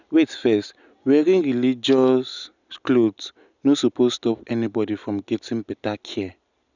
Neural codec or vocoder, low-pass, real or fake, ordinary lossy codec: none; 7.2 kHz; real; none